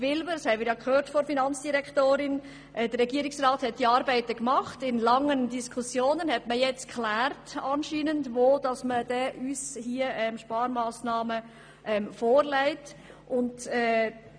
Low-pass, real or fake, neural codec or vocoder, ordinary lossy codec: 9.9 kHz; real; none; none